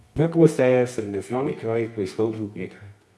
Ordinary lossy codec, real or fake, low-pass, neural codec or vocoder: none; fake; none; codec, 24 kHz, 0.9 kbps, WavTokenizer, medium music audio release